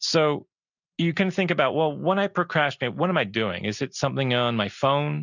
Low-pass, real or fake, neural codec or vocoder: 7.2 kHz; real; none